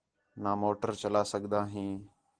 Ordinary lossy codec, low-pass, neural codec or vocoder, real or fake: Opus, 16 kbps; 9.9 kHz; none; real